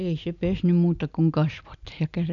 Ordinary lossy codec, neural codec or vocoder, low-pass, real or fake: none; none; 7.2 kHz; real